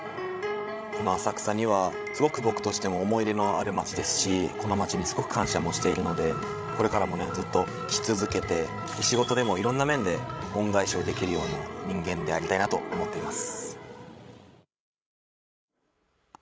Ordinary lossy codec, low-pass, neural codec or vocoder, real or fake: none; none; codec, 16 kHz, 16 kbps, FreqCodec, larger model; fake